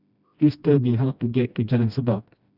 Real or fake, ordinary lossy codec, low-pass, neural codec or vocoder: fake; none; 5.4 kHz; codec, 16 kHz, 1 kbps, FreqCodec, smaller model